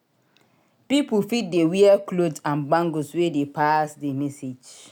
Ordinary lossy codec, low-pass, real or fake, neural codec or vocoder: none; 19.8 kHz; real; none